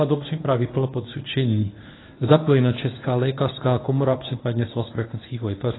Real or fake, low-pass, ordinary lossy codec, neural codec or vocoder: fake; 7.2 kHz; AAC, 16 kbps; codec, 24 kHz, 0.9 kbps, WavTokenizer, medium speech release version 1